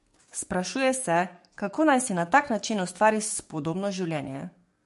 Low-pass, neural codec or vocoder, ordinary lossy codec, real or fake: 14.4 kHz; codec, 44.1 kHz, 7.8 kbps, DAC; MP3, 48 kbps; fake